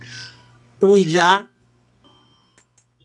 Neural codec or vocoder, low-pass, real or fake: codec, 24 kHz, 0.9 kbps, WavTokenizer, medium music audio release; 10.8 kHz; fake